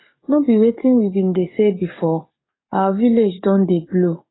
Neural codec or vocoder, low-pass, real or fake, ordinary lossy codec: codec, 44.1 kHz, 7.8 kbps, DAC; 7.2 kHz; fake; AAC, 16 kbps